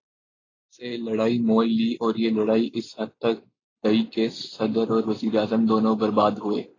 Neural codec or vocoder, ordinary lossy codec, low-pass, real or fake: none; AAC, 32 kbps; 7.2 kHz; real